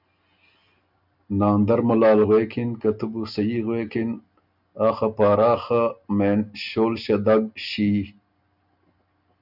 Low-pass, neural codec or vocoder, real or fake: 5.4 kHz; none; real